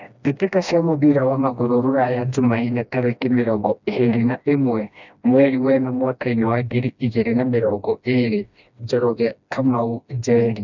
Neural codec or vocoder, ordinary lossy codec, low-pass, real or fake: codec, 16 kHz, 1 kbps, FreqCodec, smaller model; none; 7.2 kHz; fake